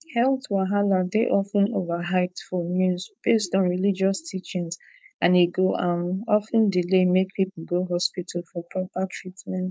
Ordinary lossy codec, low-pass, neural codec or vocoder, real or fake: none; none; codec, 16 kHz, 4.8 kbps, FACodec; fake